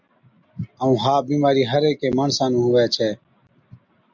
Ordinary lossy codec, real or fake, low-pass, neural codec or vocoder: MP3, 64 kbps; real; 7.2 kHz; none